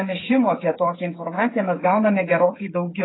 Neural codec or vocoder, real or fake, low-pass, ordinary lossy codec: codec, 16 kHz, 8 kbps, FreqCodec, smaller model; fake; 7.2 kHz; AAC, 16 kbps